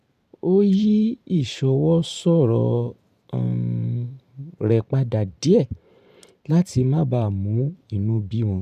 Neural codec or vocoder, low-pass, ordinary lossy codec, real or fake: vocoder, 44.1 kHz, 128 mel bands every 512 samples, BigVGAN v2; 14.4 kHz; none; fake